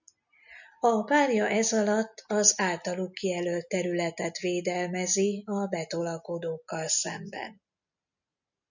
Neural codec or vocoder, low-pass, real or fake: none; 7.2 kHz; real